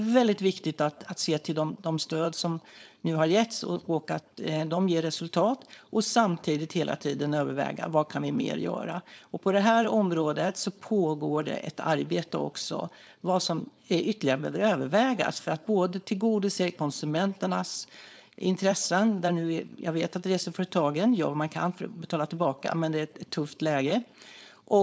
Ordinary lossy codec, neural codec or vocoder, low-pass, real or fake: none; codec, 16 kHz, 4.8 kbps, FACodec; none; fake